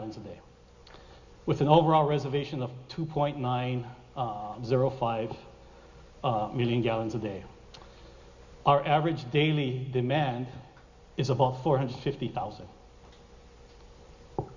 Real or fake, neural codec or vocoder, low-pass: real; none; 7.2 kHz